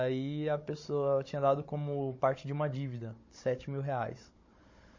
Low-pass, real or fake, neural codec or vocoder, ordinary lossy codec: 7.2 kHz; fake; codec, 16 kHz, 16 kbps, FunCodec, trained on Chinese and English, 50 frames a second; MP3, 32 kbps